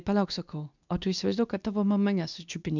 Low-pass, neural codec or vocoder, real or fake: 7.2 kHz; codec, 24 kHz, 0.9 kbps, WavTokenizer, medium speech release version 2; fake